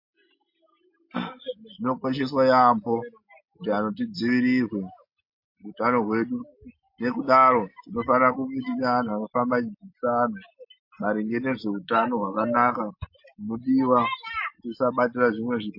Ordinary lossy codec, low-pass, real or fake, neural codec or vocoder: MP3, 32 kbps; 5.4 kHz; real; none